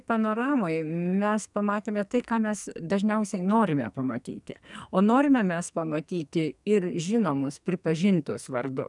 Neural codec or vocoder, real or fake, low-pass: codec, 44.1 kHz, 2.6 kbps, SNAC; fake; 10.8 kHz